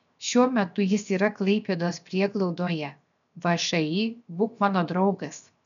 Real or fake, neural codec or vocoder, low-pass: fake; codec, 16 kHz, 0.7 kbps, FocalCodec; 7.2 kHz